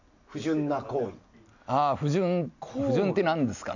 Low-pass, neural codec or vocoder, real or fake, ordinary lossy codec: 7.2 kHz; none; real; none